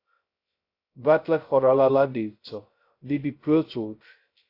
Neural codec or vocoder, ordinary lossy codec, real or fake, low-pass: codec, 16 kHz, 0.2 kbps, FocalCodec; AAC, 32 kbps; fake; 5.4 kHz